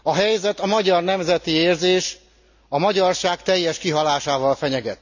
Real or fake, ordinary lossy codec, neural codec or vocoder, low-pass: real; none; none; 7.2 kHz